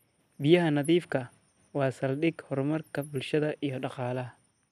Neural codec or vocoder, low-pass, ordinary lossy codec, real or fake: none; 14.4 kHz; none; real